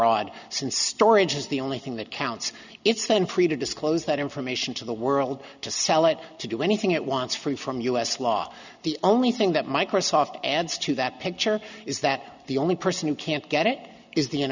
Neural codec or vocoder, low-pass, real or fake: none; 7.2 kHz; real